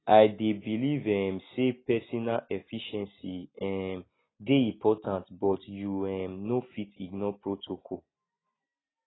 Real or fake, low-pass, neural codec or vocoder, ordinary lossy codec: real; 7.2 kHz; none; AAC, 16 kbps